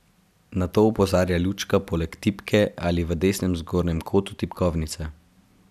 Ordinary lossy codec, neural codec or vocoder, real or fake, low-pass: none; none; real; 14.4 kHz